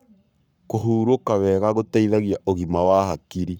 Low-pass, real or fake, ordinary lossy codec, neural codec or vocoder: 19.8 kHz; fake; none; codec, 44.1 kHz, 7.8 kbps, Pupu-Codec